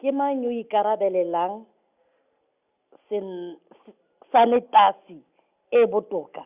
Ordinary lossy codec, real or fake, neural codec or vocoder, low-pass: Opus, 64 kbps; real; none; 3.6 kHz